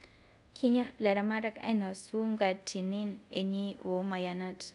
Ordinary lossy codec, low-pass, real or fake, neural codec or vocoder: none; 10.8 kHz; fake; codec, 24 kHz, 0.5 kbps, DualCodec